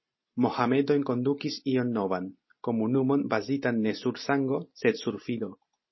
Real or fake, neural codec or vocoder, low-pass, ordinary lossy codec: real; none; 7.2 kHz; MP3, 24 kbps